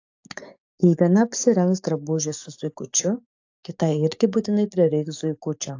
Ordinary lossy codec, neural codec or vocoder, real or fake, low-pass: AAC, 48 kbps; codec, 44.1 kHz, 7.8 kbps, DAC; fake; 7.2 kHz